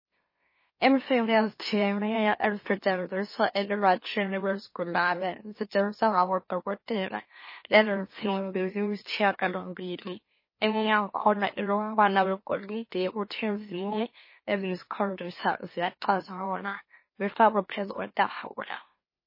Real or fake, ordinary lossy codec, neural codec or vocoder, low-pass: fake; MP3, 24 kbps; autoencoder, 44.1 kHz, a latent of 192 numbers a frame, MeloTTS; 5.4 kHz